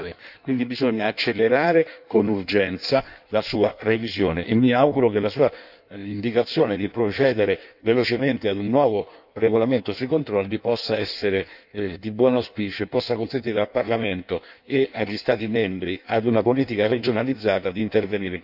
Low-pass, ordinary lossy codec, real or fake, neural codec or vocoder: 5.4 kHz; none; fake; codec, 16 kHz in and 24 kHz out, 1.1 kbps, FireRedTTS-2 codec